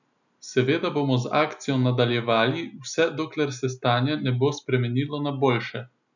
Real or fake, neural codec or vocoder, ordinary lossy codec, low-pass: real; none; none; 7.2 kHz